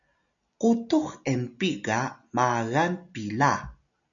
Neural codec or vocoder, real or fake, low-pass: none; real; 7.2 kHz